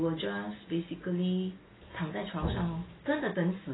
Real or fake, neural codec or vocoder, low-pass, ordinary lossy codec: real; none; 7.2 kHz; AAC, 16 kbps